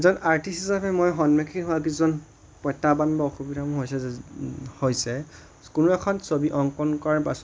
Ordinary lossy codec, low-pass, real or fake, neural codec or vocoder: none; none; real; none